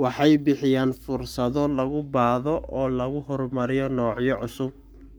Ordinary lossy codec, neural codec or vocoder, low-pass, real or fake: none; codec, 44.1 kHz, 7.8 kbps, Pupu-Codec; none; fake